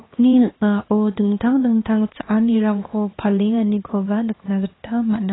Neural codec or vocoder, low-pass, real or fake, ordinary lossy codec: codec, 16 kHz, 2 kbps, X-Codec, HuBERT features, trained on LibriSpeech; 7.2 kHz; fake; AAC, 16 kbps